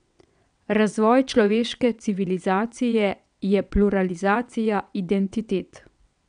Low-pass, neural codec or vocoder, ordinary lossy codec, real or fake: 9.9 kHz; vocoder, 22.05 kHz, 80 mel bands, WaveNeXt; none; fake